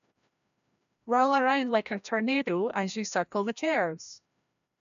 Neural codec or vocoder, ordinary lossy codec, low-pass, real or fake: codec, 16 kHz, 1 kbps, FreqCodec, larger model; none; 7.2 kHz; fake